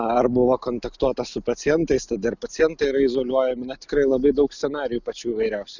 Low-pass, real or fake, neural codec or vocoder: 7.2 kHz; real; none